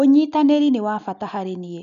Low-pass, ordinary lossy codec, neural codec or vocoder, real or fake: 7.2 kHz; none; none; real